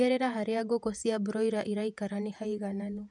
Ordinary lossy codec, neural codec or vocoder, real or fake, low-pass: none; vocoder, 48 kHz, 128 mel bands, Vocos; fake; 10.8 kHz